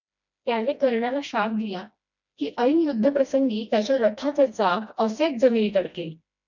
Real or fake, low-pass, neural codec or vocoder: fake; 7.2 kHz; codec, 16 kHz, 1 kbps, FreqCodec, smaller model